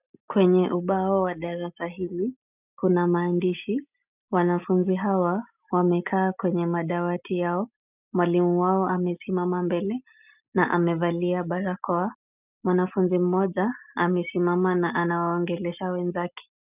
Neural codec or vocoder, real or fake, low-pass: none; real; 3.6 kHz